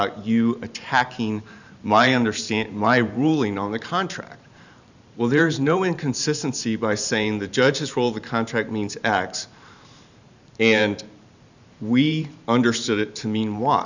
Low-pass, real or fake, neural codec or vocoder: 7.2 kHz; fake; vocoder, 44.1 kHz, 128 mel bands every 512 samples, BigVGAN v2